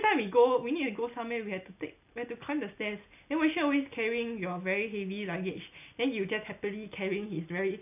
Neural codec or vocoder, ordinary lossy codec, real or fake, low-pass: none; none; real; 3.6 kHz